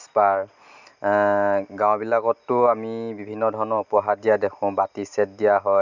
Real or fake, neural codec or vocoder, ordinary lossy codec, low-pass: real; none; none; 7.2 kHz